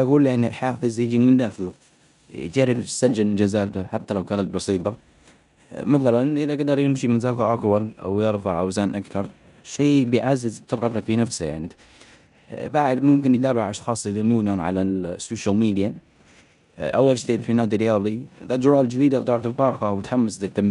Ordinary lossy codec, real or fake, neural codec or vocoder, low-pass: none; fake; codec, 16 kHz in and 24 kHz out, 0.9 kbps, LongCat-Audio-Codec, four codebook decoder; 10.8 kHz